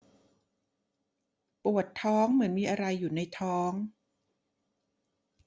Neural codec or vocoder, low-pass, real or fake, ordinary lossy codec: none; none; real; none